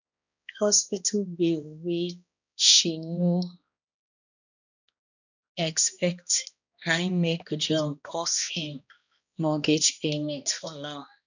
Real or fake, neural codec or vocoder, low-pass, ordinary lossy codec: fake; codec, 16 kHz, 1 kbps, X-Codec, HuBERT features, trained on balanced general audio; 7.2 kHz; none